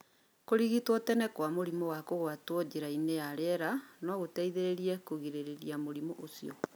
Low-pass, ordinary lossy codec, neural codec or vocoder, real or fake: none; none; none; real